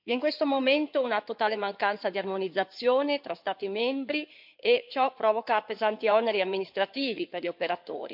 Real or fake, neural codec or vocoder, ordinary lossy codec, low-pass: fake; codec, 16 kHz in and 24 kHz out, 2.2 kbps, FireRedTTS-2 codec; MP3, 48 kbps; 5.4 kHz